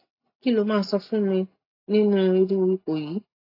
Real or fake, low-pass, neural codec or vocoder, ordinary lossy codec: real; 5.4 kHz; none; AAC, 32 kbps